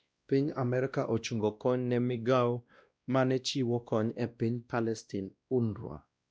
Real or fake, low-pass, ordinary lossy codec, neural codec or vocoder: fake; none; none; codec, 16 kHz, 1 kbps, X-Codec, WavLM features, trained on Multilingual LibriSpeech